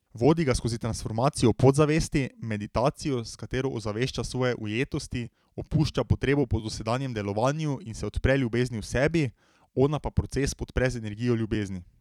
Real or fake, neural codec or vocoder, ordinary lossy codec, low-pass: fake; vocoder, 44.1 kHz, 128 mel bands every 256 samples, BigVGAN v2; none; 19.8 kHz